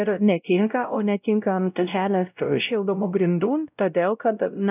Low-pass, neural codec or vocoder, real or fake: 3.6 kHz; codec, 16 kHz, 0.5 kbps, X-Codec, WavLM features, trained on Multilingual LibriSpeech; fake